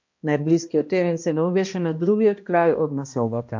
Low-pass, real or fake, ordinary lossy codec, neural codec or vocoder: 7.2 kHz; fake; MP3, 48 kbps; codec, 16 kHz, 1 kbps, X-Codec, HuBERT features, trained on balanced general audio